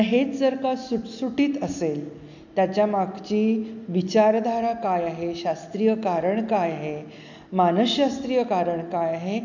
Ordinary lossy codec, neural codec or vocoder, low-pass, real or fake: none; none; 7.2 kHz; real